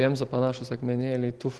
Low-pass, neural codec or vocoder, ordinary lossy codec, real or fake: 10.8 kHz; none; Opus, 16 kbps; real